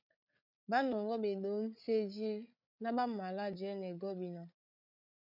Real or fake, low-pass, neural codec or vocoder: fake; 5.4 kHz; codec, 16 kHz, 8 kbps, FreqCodec, larger model